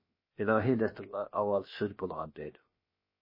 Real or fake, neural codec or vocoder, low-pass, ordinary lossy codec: fake; codec, 16 kHz, about 1 kbps, DyCAST, with the encoder's durations; 5.4 kHz; MP3, 24 kbps